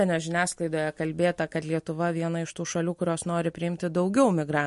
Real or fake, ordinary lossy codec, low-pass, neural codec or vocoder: real; MP3, 48 kbps; 14.4 kHz; none